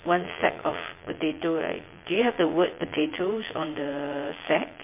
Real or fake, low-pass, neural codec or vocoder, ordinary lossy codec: fake; 3.6 kHz; vocoder, 22.05 kHz, 80 mel bands, Vocos; MP3, 24 kbps